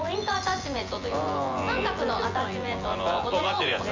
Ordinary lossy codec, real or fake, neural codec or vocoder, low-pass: Opus, 32 kbps; fake; vocoder, 24 kHz, 100 mel bands, Vocos; 7.2 kHz